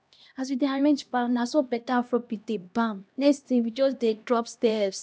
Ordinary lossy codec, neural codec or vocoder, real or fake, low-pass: none; codec, 16 kHz, 1 kbps, X-Codec, HuBERT features, trained on LibriSpeech; fake; none